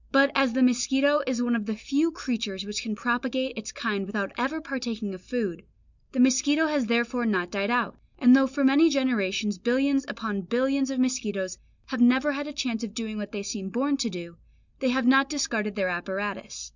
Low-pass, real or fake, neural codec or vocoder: 7.2 kHz; real; none